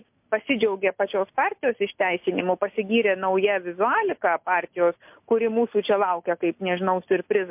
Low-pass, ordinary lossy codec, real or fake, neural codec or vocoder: 3.6 kHz; MP3, 32 kbps; real; none